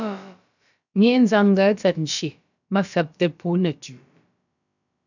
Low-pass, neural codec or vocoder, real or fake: 7.2 kHz; codec, 16 kHz, about 1 kbps, DyCAST, with the encoder's durations; fake